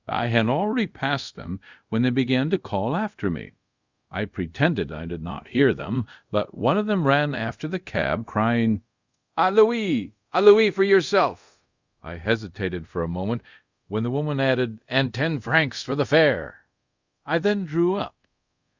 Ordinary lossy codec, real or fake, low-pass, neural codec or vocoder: Opus, 64 kbps; fake; 7.2 kHz; codec, 24 kHz, 0.5 kbps, DualCodec